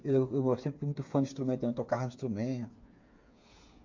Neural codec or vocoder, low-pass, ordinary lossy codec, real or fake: codec, 16 kHz, 8 kbps, FreqCodec, smaller model; 7.2 kHz; MP3, 48 kbps; fake